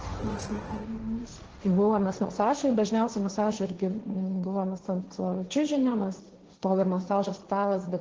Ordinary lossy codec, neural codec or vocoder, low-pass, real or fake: Opus, 16 kbps; codec, 16 kHz, 1.1 kbps, Voila-Tokenizer; 7.2 kHz; fake